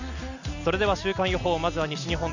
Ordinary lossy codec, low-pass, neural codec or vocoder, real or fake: none; 7.2 kHz; none; real